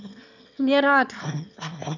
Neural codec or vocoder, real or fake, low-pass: autoencoder, 22.05 kHz, a latent of 192 numbers a frame, VITS, trained on one speaker; fake; 7.2 kHz